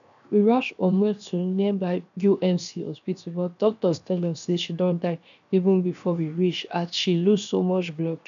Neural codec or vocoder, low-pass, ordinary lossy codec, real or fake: codec, 16 kHz, 0.7 kbps, FocalCodec; 7.2 kHz; none; fake